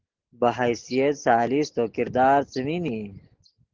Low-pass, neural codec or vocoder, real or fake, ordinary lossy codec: 7.2 kHz; none; real; Opus, 16 kbps